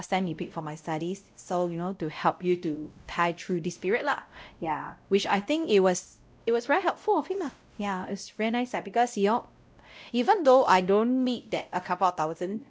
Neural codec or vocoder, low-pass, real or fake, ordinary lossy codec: codec, 16 kHz, 0.5 kbps, X-Codec, WavLM features, trained on Multilingual LibriSpeech; none; fake; none